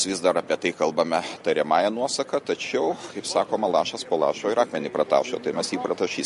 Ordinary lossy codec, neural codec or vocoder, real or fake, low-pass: MP3, 48 kbps; none; real; 14.4 kHz